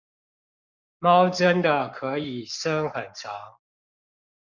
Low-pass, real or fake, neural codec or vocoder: 7.2 kHz; fake; codec, 44.1 kHz, 7.8 kbps, Pupu-Codec